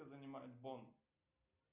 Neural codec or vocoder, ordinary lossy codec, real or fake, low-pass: none; Opus, 64 kbps; real; 3.6 kHz